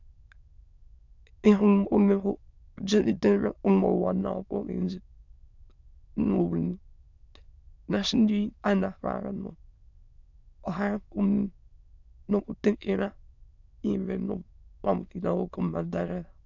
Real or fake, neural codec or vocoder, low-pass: fake; autoencoder, 22.05 kHz, a latent of 192 numbers a frame, VITS, trained on many speakers; 7.2 kHz